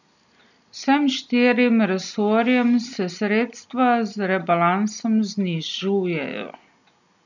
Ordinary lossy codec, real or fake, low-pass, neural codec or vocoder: none; real; 7.2 kHz; none